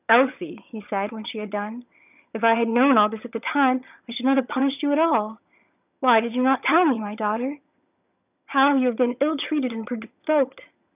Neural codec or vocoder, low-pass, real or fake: vocoder, 22.05 kHz, 80 mel bands, HiFi-GAN; 3.6 kHz; fake